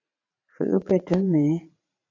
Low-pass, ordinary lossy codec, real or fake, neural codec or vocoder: 7.2 kHz; AAC, 32 kbps; fake; vocoder, 44.1 kHz, 80 mel bands, Vocos